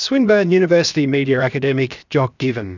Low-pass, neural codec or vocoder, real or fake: 7.2 kHz; codec, 16 kHz, 0.7 kbps, FocalCodec; fake